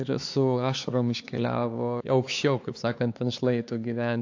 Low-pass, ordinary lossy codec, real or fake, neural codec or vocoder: 7.2 kHz; AAC, 48 kbps; fake; codec, 16 kHz, 4 kbps, X-Codec, HuBERT features, trained on LibriSpeech